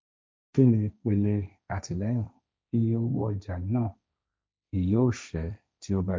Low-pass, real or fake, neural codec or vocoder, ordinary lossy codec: none; fake; codec, 16 kHz, 1.1 kbps, Voila-Tokenizer; none